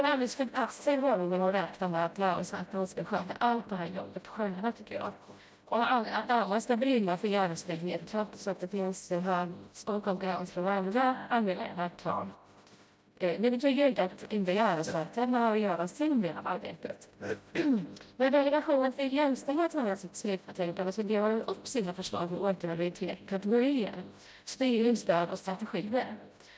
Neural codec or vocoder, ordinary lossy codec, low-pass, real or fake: codec, 16 kHz, 0.5 kbps, FreqCodec, smaller model; none; none; fake